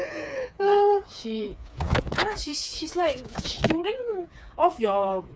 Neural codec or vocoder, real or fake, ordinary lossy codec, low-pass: codec, 16 kHz, 4 kbps, FreqCodec, smaller model; fake; none; none